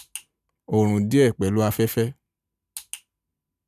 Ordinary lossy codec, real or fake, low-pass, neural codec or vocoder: none; real; 14.4 kHz; none